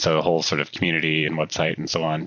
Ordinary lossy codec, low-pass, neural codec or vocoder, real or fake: Opus, 64 kbps; 7.2 kHz; vocoder, 44.1 kHz, 128 mel bands every 256 samples, BigVGAN v2; fake